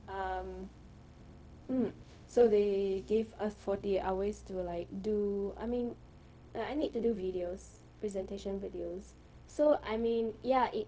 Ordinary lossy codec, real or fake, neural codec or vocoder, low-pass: none; fake; codec, 16 kHz, 0.4 kbps, LongCat-Audio-Codec; none